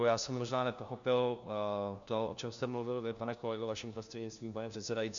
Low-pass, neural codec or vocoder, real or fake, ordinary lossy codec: 7.2 kHz; codec, 16 kHz, 1 kbps, FunCodec, trained on LibriTTS, 50 frames a second; fake; MP3, 64 kbps